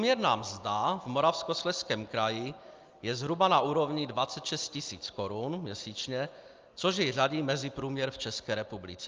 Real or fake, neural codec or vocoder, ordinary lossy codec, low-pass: real; none; Opus, 24 kbps; 7.2 kHz